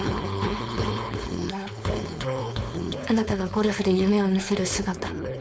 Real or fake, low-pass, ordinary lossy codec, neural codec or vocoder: fake; none; none; codec, 16 kHz, 4.8 kbps, FACodec